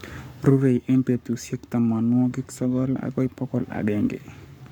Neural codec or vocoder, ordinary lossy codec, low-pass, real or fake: codec, 44.1 kHz, 7.8 kbps, Pupu-Codec; none; 19.8 kHz; fake